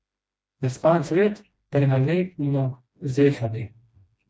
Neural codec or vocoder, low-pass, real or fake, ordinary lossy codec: codec, 16 kHz, 1 kbps, FreqCodec, smaller model; none; fake; none